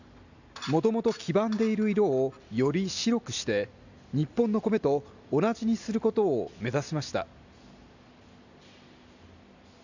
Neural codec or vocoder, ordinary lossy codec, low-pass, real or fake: none; none; 7.2 kHz; real